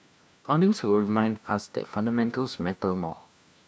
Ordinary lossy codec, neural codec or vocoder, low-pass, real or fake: none; codec, 16 kHz, 1 kbps, FunCodec, trained on LibriTTS, 50 frames a second; none; fake